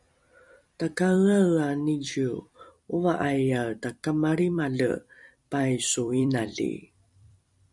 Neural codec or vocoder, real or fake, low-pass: vocoder, 44.1 kHz, 128 mel bands every 256 samples, BigVGAN v2; fake; 10.8 kHz